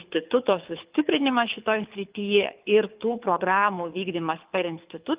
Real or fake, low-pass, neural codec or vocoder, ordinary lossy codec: fake; 3.6 kHz; codec, 24 kHz, 6 kbps, HILCodec; Opus, 32 kbps